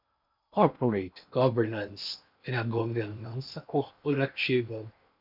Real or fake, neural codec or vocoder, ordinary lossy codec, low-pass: fake; codec, 16 kHz in and 24 kHz out, 0.8 kbps, FocalCodec, streaming, 65536 codes; MP3, 48 kbps; 5.4 kHz